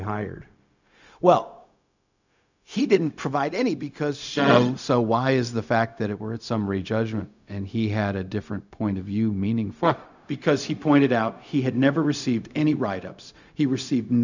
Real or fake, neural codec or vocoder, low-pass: fake; codec, 16 kHz, 0.4 kbps, LongCat-Audio-Codec; 7.2 kHz